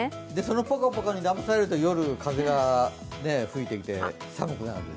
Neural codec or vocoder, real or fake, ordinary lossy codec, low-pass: none; real; none; none